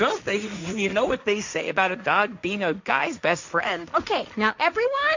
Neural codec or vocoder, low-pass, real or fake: codec, 16 kHz, 1.1 kbps, Voila-Tokenizer; 7.2 kHz; fake